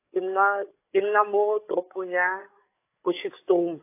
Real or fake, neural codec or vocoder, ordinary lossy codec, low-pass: fake; codec, 16 kHz, 8 kbps, FreqCodec, larger model; AAC, 24 kbps; 3.6 kHz